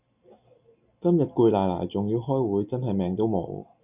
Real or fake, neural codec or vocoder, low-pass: real; none; 3.6 kHz